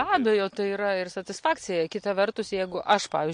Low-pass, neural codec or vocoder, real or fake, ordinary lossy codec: 9.9 kHz; none; real; MP3, 48 kbps